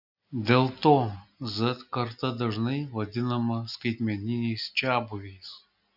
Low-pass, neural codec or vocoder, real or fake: 5.4 kHz; none; real